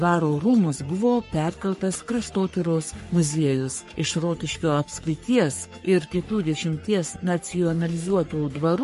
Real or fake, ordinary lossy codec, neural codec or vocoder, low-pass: fake; MP3, 48 kbps; codec, 44.1 kHz, 3.4 kbps, Pupu-Codec; 14.4 kHz